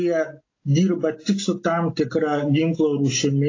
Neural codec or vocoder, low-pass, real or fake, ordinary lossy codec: none; 7.2 kHz; real; AAC, 32 kbps